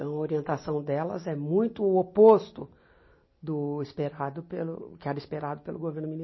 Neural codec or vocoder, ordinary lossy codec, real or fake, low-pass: none; MP3, 24 kbps; real; 7.2 kHz